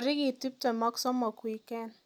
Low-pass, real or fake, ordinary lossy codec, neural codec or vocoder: 19.8 kHz; real; none; none